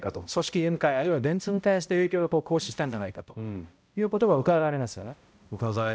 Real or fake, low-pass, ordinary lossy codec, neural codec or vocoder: fake; none; none; codec, 16 kHz, 0.5 kbps, X-Codec, HuBERT features, trained on balanced general audio